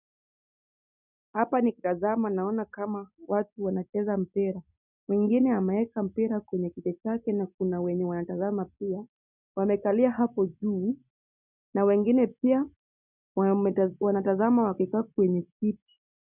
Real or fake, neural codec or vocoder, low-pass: real; none; 3.6 kHz